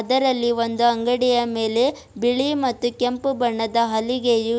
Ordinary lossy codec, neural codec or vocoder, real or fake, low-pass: none; none; real; none